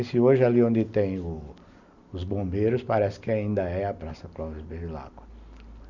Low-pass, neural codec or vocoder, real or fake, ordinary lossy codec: 7.2 kHz; none; real; Opus, 64 kbps